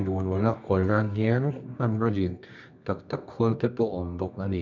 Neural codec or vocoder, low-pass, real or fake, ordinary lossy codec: codec, 24 kHz, 0.9 kbps, WavTokenizer, medium music audio release; 7.2 kHz; fake; none